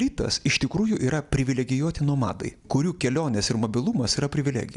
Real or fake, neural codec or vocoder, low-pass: real; none; 10.8 kHz